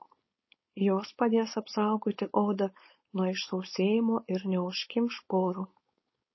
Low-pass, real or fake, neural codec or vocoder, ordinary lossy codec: 7.2 kHz; fake; codec, 16 kHz, 4.8 kbps, FACodec; MP3, 24 kbps